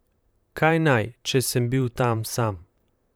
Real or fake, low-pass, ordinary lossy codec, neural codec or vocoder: fake; none; none; vocoder, 44.1 kHz, 128 mel bands, Pupu-Vocoder